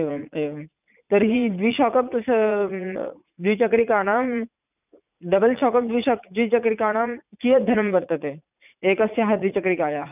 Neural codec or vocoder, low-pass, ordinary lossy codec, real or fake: vocoder, 44.1 kHz, 80 mel bands, Vocos; 3.6 kHz; none; fake